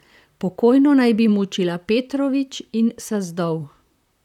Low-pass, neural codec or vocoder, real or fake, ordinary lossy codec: 19.8 kHz; vocoder, 44.1 kHz, 128 mel bands, Pupu-Vocoder; fake; none